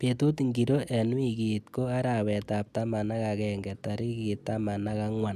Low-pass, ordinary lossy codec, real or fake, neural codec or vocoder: 14.4 kHz; none; real; none